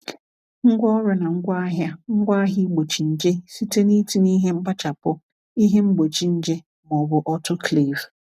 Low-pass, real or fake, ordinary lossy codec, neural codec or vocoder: 14.4 kHz; real; none; none